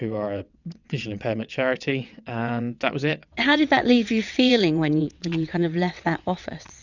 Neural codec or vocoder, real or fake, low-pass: vocoder, 22.05 kHz, 80 mel bands, WaveNeXt; fake; 7.2 kHz